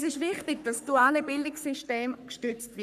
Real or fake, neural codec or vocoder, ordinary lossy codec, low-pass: fake; codec, 44.1 kHz, 3.4 kbps, Pupu-Codec; none; 14.4 kHz